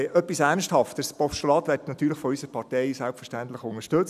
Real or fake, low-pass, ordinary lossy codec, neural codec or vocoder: real; 14.4 kHz; none; none